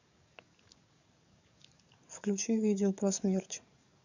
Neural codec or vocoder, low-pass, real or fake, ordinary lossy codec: codec, 44.1 kHz, 7.8 kbps, DAC; 7.2 kHz; fake; none